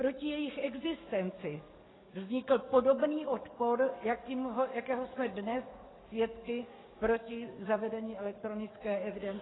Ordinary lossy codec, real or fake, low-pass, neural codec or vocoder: AAC, 16 kbps; fake; 7.2 kHz; codec, 44.1 kHz, 7.8 kbps, DAC